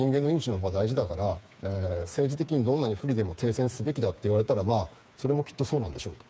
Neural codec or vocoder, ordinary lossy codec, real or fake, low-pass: codec, 16 kHz, 4 kbps, FreqCodec, smaller model; none; fake; none